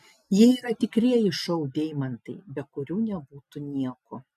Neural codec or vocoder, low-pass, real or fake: none; 14.4 kHz; real